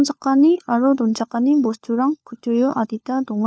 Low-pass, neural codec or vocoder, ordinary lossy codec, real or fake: none; codec, 16 kHz, 4 kbps, FunCodec, trained on LibriTTS, 50 frames a second; none; fake